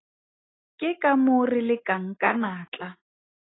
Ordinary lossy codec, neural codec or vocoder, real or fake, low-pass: AAC, 16 kbps; none; real; 7.2 kHz